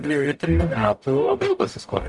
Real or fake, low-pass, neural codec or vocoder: fake; 10.8 kHz; codec, 44.1 kHz, 0.9 kbps, DAC